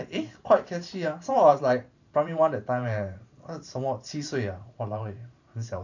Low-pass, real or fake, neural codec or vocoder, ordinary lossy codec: 7.2 kHz; fake; vocoder, 44.1 kHz, 128 mel bands every 512 samples, BigVGAN v2; none